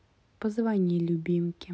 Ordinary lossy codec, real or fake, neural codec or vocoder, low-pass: none; real; none; none